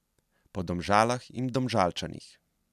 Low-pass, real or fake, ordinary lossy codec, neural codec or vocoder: 14.4 kHz; real; none; none